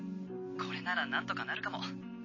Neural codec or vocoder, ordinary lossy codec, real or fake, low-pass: none; MP3, 32 kbps; real; 7.2 kHz